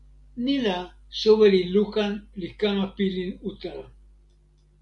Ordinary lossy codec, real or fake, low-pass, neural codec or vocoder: MP3, 96 kbps; real; 10.8 kHz; none